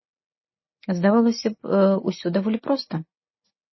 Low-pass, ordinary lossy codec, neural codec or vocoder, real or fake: 7.2 kHz; MP3, 24 kbps; none; real